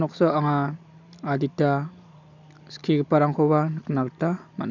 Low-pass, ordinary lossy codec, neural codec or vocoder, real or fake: 7.2 kHz; none; none; real